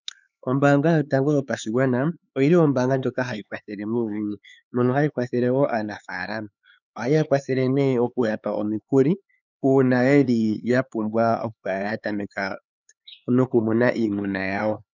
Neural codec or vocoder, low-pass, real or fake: codec, 16 kHz, 4 kbps, X-Codec, HuBERT features, trained on LibriSpeech; 7.2 kHz; fake